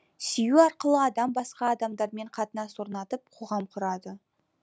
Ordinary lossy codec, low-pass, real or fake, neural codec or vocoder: none; none; real; none